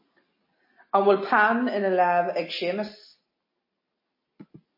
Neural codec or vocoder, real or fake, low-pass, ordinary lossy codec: none; real; 5.4 kHz; MP3, 24 kbps